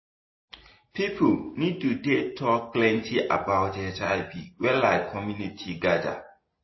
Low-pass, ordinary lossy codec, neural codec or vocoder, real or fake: 7.2 kHz; MP3, 24 kbps; none; real